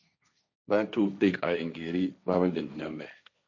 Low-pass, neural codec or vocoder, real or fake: 7.2 kHz; codec, 16 kHz, 1.1 kbps, Voila-Tokenizer; fake